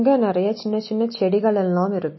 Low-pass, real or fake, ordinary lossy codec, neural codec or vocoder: 7.2 kHz; real; MP3, 24 kbps; none